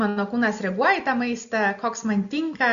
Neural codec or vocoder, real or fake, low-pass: none; real; 7.2 kHz